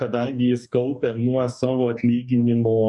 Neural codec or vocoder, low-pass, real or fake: codec, 44.1 kHz, 2.6 kbps, DAC; 10.8 kHz; fake